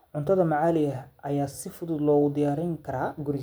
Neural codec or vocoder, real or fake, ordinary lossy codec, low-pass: none; real; none; none